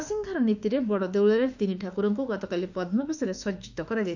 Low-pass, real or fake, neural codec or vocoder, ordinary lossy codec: 7.2 kHz; fake; autoencoder, 48 kHz, 32 numbers a frame, DAC-VAE, trained on Japanese speech; none